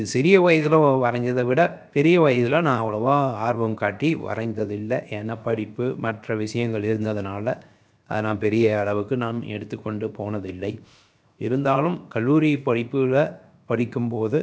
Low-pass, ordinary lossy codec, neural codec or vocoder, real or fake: none; none; codec, 16 kHz, 0.7 kbps, FocalCodec; fake